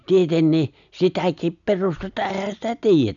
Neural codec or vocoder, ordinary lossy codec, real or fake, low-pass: none; none; real; 7.2 kHz